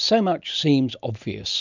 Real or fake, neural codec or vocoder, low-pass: real; none; 7.2 kHz